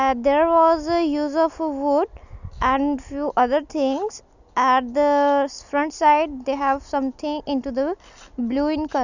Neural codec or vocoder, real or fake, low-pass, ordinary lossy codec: none; real; 7.2 kHz; none